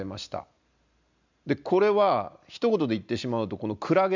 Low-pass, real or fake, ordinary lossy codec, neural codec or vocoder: 7.2 kHz; real; none; none